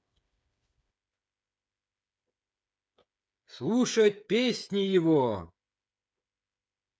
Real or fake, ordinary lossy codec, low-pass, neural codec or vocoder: fake; none; none; codec, 16 kHz, 8 kbps, FreqCodec, smaller model